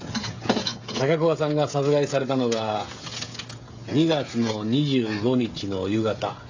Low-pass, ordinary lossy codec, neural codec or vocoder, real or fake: 7.2 kHz; AAC, 48 kbps; codec, 16 kHz, 16 kbps, FreqCodec, smaller model; fake